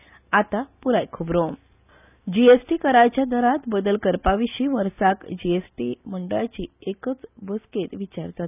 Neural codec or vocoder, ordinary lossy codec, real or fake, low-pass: none; none; real; 3.6 kHz